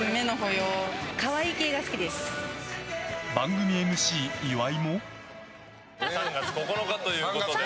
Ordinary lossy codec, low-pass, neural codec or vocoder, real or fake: none; none; none; real